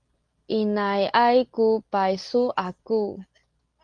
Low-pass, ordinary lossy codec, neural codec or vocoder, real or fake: 9.9 kHz; Opus, 24 kbps; none; real